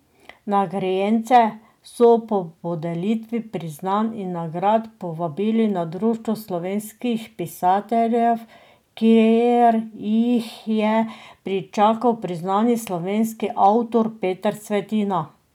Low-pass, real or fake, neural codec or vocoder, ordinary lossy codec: 19.8 kHz; real; none; none